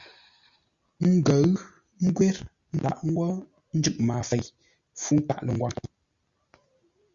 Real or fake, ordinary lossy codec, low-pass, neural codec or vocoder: real; Opus, 64 kbps; 7.2 kHz; none